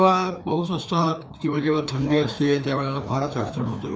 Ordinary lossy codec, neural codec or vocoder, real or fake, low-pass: none; codec, 16 kHz, 2 kbps, FreqCodec, larger model; fake; none